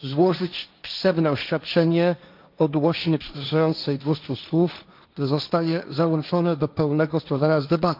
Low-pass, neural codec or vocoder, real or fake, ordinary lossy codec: 5.4 kHz; codec, 16 kHz, 1.1 kbps, Voila-Tokenizer; fake; none